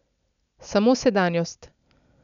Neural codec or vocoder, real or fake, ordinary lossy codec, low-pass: none; real; none; 7.2 kHz